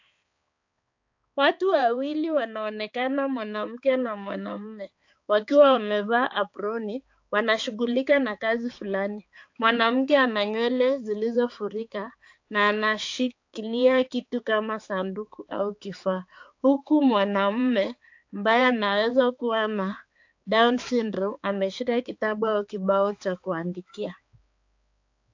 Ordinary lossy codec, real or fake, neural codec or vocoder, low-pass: AAC, 48 kbps; fake; codec, 16 kHz, 4 kbps, X-Codec, HuBERT features, trained on balanced general audio; 7.2 kHz